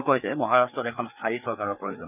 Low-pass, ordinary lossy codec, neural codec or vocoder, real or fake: 3.6 kHz; none; codec, 16 kHz, 4 kbps, FreqCodec, larger model; fake